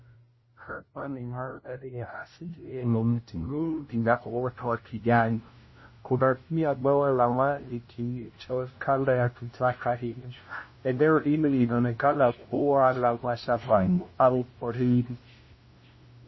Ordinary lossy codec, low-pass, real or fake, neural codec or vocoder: MP3, 24 kbps; 7.2 kHz; fake; codec, 16 kHz, 0.5 kbps, FunCodec, trained on LibriTTS, 25 frames a second